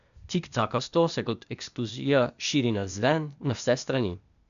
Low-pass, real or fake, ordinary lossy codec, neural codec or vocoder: 7.2 kHz; fake; none; codec, 16 kHz, 0.8 kbps, ZipCodec